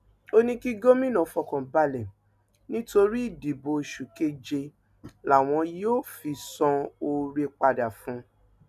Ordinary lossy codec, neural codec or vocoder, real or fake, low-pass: none; none; real; 14.4 kHz